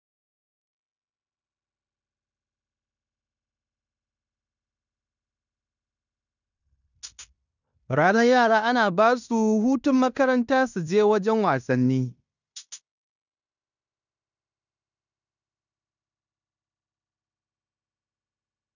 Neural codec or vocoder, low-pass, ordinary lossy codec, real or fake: codec, 16 kHz in and 24 kHz out, 0.9 kbps, LongCat-Audio-Codec, fine tuned four codebook decoder; 7.2 kHz; none; fake